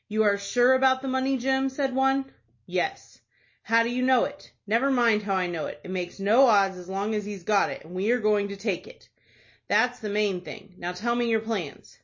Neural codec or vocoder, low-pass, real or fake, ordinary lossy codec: none; 7.2 kHz; real; MP3, 32 kbps